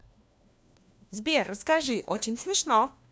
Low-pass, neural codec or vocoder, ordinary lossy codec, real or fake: none; codec, 16 kHz, 1 kbps, FunCodec, trained on LibriTTS, 50 frames a second; none; fake